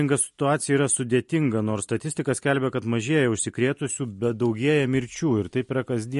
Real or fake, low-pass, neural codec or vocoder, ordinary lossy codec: real; 14.4 kHz; none; MP3, 48 kbps